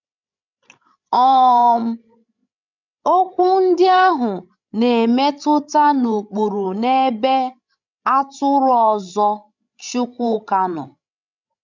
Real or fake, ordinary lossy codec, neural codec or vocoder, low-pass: fake; none; codec, 16 kHz, 16 kbps, FreqCodec, larger model; 7.2 kHz